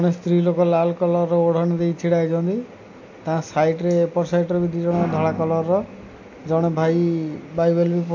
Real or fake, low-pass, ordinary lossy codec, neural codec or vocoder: real; 7.2 kHz; AAC, 48 kbps; none